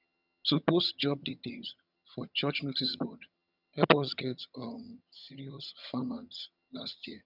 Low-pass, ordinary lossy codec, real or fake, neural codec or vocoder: 5.4 kHz; none; fake; vocoder, 22.05 kHz, 80 mel bands, HiFi-GAN